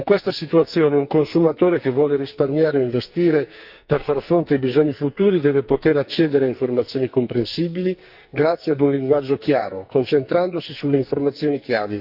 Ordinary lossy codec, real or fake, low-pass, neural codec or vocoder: Opus, 64 kbps; fake; 5.4 kHz; codec, 44.1 kHz, 2.6 kbps, SNAC